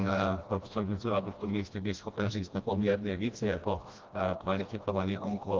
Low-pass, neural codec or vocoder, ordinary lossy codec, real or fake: 7.2 kHz; codec, 16 kHz, 1 kbps, FreqCodec, smaller model; Opus, 32 kbps; fake